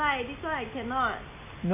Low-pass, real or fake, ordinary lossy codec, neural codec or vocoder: 3.6 kHz; real; none; none